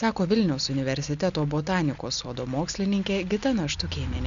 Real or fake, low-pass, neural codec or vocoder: real; 7.2 kHz; none